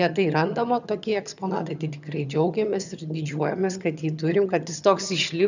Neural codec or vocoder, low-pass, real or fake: vocoder, 22.05 kHz, 80 mel bands, HiFi-GAN; 7.2 kHz; fake